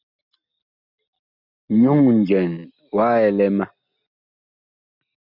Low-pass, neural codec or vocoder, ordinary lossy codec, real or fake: 5.4 kHz; none; MP3, 48 kbps; real